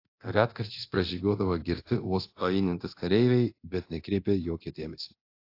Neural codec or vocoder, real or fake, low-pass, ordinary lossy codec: codec, 24 kHz, 0.9 kbps, DualCodec; fake; 5.4 kHz; AAC, 32 kbps